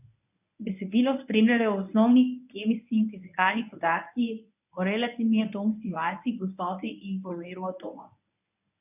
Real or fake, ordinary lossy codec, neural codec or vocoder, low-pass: fake; none; codec, 24 kHz, 0.9 kbps, WavTokenizer, medium speech release version 1; 3.6 kHz